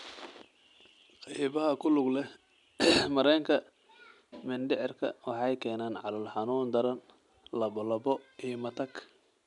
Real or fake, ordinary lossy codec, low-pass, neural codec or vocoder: real; none; 10.8 kHz; none